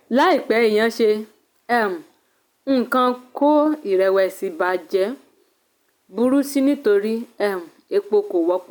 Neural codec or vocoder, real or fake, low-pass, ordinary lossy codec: none; real; none; none